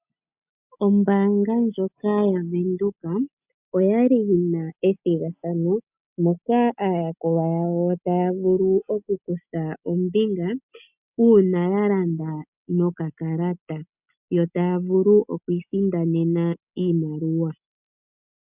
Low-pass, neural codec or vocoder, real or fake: 3.6 kHz; none; real